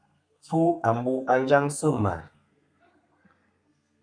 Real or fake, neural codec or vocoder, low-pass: fake; codec, 32 kHz, 1.9 kbps, SNAC; 9.9 kHz